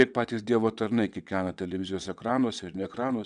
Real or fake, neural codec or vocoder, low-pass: fake; vocoder, 22.05 kHz, 80 mel bands, WaveNeXt; 9.9 kHz